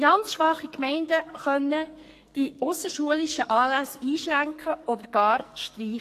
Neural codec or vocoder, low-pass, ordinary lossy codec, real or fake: codec, 44.1 kHz, 2.6 kbps, SNAC; 14.4 kHz; AAC, 64 kbps; fake